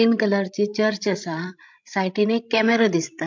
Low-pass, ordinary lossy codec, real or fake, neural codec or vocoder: 7.2 kHz; MP3, 64 kbps; fake; codec, 16 kHz, 16 kbps, FreqCodec, larger model